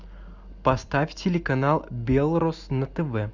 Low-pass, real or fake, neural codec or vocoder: 7.2 kHz; real; none